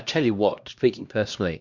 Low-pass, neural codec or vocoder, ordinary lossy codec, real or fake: 7.2 kHz; codec, 16 kHz, 1 kbps, X-Codec, HuBERT features, trained on LibriSpeech; Opus, 64 kbps; fake